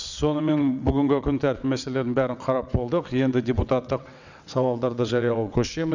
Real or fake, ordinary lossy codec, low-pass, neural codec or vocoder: fake; none; 7.2 kHz; vocoder, 22.05 kHz, 80 mel bands, WaveNeXt